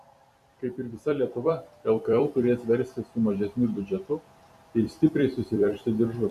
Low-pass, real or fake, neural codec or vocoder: 14.4 kHz; fake; vocoder, 44.1 kHz, 128 mel bands every 256 samples, BigVGAN v2